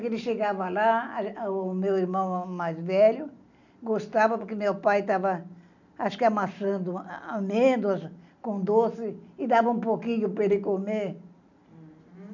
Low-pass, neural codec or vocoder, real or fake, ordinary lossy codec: 7.2 kHz; none; real; none